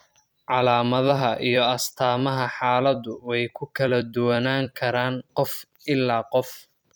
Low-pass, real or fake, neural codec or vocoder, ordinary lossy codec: none; fake; vocoder, 44.1 kHz, 128 mel bands every 256 samples, BigVGAN v2; none